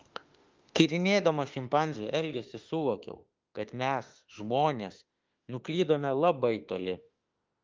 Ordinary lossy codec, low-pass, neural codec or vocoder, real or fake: Opus, 32 kbps; 7.2 kHz; autoencoder, 48 kHz, 32 numbers a frame, DAC-VAE, trained on Japanese speech; fake